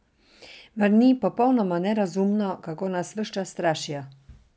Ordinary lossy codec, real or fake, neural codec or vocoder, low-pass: none; real; none; none